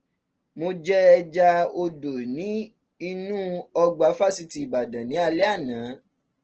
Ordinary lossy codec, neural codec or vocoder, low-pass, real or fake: Opus, 16 kbps; none; 7.2 kHz; real